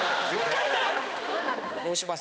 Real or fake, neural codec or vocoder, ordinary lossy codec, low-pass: fake; codec, 16 kHz, 2 kbps, X-Codec, HuBERT features, trained on balanced general audio; none; none